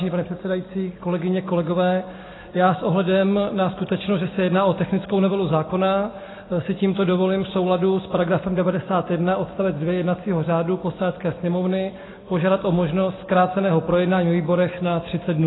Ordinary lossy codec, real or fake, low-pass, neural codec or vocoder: AAC, 16 kbps; real; 7.2 kHz; none